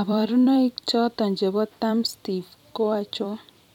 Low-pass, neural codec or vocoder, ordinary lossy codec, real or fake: 19.8 kHz; vocoder, 44.1 kHz, 128 mel bands every 256 samples, BigVGAN v2; none; fake